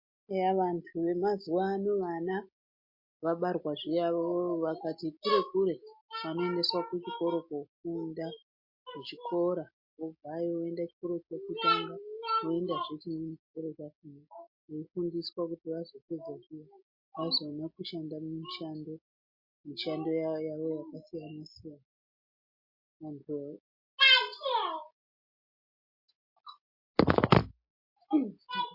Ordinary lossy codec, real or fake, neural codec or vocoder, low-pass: MP3, 32 kbps; real; none; 5.4 kHz